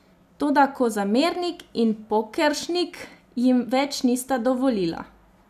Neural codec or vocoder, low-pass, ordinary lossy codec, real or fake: none; 14.4 kHz; none; real